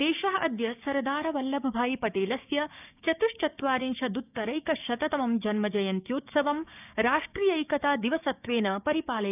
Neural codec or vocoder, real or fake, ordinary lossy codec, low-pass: codec, 16 kHz, 6 kbps, DAC; fake; none; 3.6 kHz